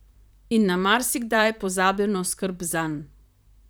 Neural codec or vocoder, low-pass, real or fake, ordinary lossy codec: vocoder, 44.1 kHz, 128 mel bands, Pupu-Vocoder; none; fake; none